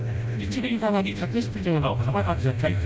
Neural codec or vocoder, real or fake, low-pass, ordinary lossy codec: codec, 16 kHz, 0.5 kbps, FreqCodec, smaller model; fake; none; none